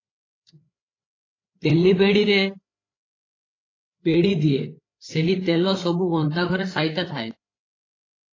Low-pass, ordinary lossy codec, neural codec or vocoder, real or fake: 7.2 kHz; AAC, 32 kbps; codec, 16 kHz, 8 kbps, FreqCodec, larger model; fake